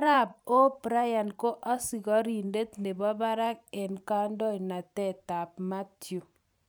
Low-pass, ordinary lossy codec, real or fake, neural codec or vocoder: none; none; fake; vocoder, 44.1 kHz, 128 mel bands every 512 samples, BigVGAN v2